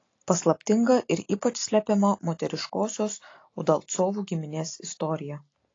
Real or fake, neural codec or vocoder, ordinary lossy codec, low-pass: real; none; AAC, 32 kbps; 7.2 kHz